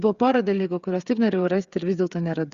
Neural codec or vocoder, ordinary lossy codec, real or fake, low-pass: codec, 16 kHz, 8 kbps, FreqCodec, smaller model; Opus, 64 kbps; fake; 7.2 kHz